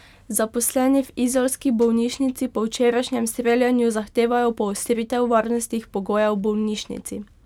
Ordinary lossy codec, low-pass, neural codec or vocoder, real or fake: none; 19.8 kHz; none; real